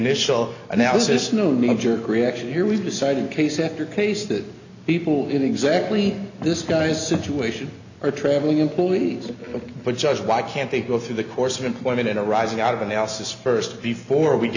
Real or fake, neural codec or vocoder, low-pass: real; none; 7.2 kHz